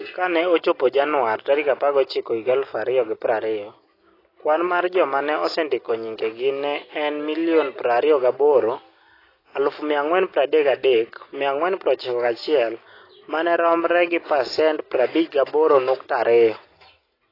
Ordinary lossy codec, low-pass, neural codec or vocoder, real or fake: AAC, 24 kbps; 5.4 kHz; none; real